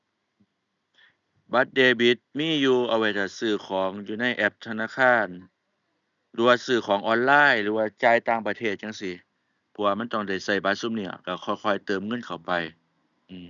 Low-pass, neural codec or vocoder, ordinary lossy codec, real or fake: 7.2 kHz; none; none; real